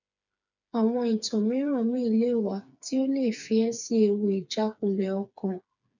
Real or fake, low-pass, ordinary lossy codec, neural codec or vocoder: fake; 7.2 kHz; none; codec, 16 kHz, 4 kbps, FreqCodec, smaller model